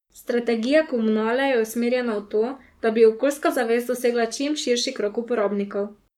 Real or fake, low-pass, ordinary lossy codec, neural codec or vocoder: fake; 19.8 kHz; none; codec, 44.1 kHz, 7.8 kbps, Pupu-Codec